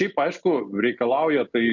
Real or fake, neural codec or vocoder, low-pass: real; none; 7.2 kHz